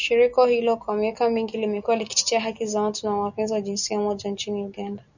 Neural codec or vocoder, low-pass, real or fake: none; 7.2 kHz; real